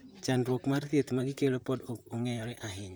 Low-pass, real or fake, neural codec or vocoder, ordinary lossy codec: none; fake; vocoder, 44.1 kHz, 128 mel bands, Pupu-Vocoder; none